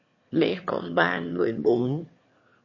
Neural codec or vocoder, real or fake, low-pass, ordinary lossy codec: autoencoder, 22.05 kHz, a latent of 192 numbers a frame, VITS, trained on one speaker; fake; 7.2 kHz; MP3, 32 kbps